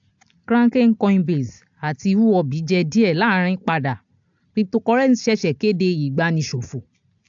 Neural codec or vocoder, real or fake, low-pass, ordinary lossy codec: none; real; 7.2 kHz; AAC, 64 kbps